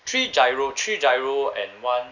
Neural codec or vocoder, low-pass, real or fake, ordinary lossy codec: none; 7.2 kHz; real; none